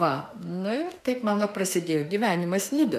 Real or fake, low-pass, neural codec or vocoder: fake; 14.4 kHz; autoencoder, 48 kHz, 32 numbers a frame, DAC-VAE, trained on Japanese speech